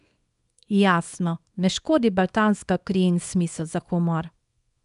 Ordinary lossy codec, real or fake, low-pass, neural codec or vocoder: none; fake; 10.8 kHz; codec, 24 kHz, 0.9 kbps, WavTokenizer, small release